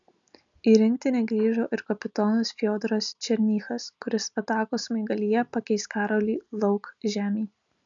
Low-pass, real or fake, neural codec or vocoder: 7.2 kHz; real; none